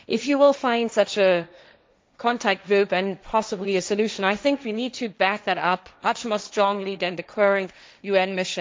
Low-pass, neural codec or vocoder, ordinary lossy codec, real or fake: none; codec, 16 kHz, 1.1 kbps, Voila-Tokenizer; none; fake